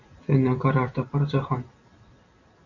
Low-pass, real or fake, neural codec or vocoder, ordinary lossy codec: 7.2 kHz; real; none; AAC, 48 kbps